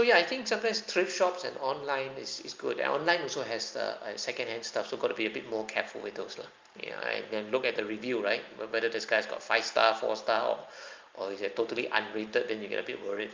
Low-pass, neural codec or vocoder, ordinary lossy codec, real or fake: 7.2 kHz; none; Opus, 24 kbps; real